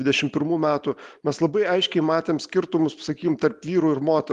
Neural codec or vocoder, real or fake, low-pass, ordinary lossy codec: none; real; 10.8 kHz; Opus, 24 kbps